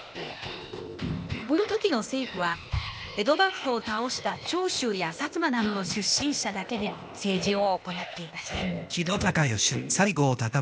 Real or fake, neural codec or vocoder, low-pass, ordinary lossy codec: fake; codec, 16 kHz, 0.8 kbps, ZipCodec; none; none